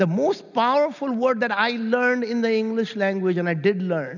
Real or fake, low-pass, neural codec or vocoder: real; 7.2 kHz; none